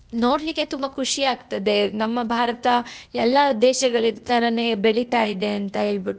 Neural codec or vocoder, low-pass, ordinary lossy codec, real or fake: codec, 16 kHz, 0.8 kbps, ZipCodec; none; none; fake